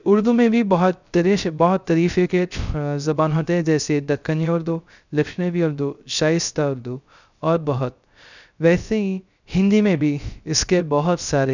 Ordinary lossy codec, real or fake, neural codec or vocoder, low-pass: none; fake; codec, 16 kHz, 0.3 kbps, FocalCodec; 7.2 kHz